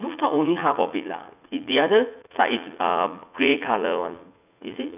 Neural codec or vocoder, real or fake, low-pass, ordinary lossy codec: vocoder, 44.1 kHz, 80 mel bands, Vocos; fake; 3.6 kHz; none